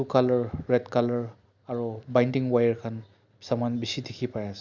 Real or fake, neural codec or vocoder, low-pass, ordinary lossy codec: real; none; 7.2 kHz; none